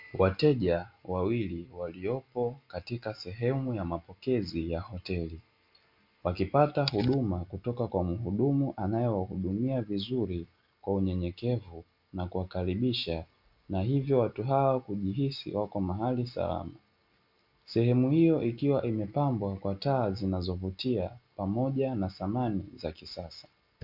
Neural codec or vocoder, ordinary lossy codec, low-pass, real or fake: none; MP3, 48 kbps; 5.4 kHz; real